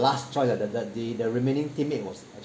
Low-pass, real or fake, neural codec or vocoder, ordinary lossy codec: none; real; none; none